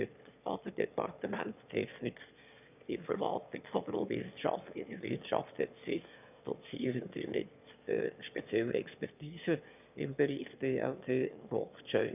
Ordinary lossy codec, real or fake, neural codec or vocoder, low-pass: none; fake; autoencoder, 22.05 kHz, a latent of 192 numbers a frame, VITS, trained on one speaker; 3.6 kHz